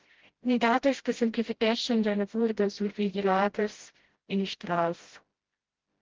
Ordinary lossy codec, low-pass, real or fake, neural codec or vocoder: Opus, 16 kbps; 7.2 kHz; fake; codec, 16 kHz, 0.5 kbps, FreqCodec, smaller model